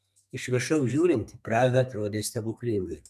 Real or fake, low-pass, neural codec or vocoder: fake; 14.4 kHz; codec, 32 kHz, 1.9 kbps, SNAC